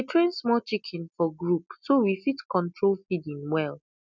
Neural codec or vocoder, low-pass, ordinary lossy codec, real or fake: none; 7.2 kHz; none; real